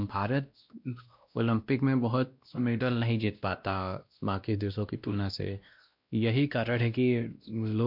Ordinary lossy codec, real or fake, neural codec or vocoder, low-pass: MP3, 48 kbps; fake; codec, 16 kHz, 1 kbps, X-Codec, WavLM features, trained on Multilingual LibriSpeech; 5.4 kHz